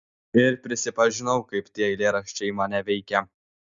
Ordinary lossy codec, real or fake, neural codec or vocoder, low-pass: Opus, 64 kbps; real; none; 7.2 kHz